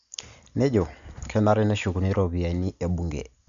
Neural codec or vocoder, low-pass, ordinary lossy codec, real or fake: none; 7.2 kHz; MP3, 96 kbps; real